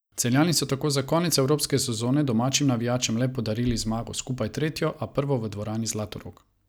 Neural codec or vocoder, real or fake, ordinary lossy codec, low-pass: none; real; none; none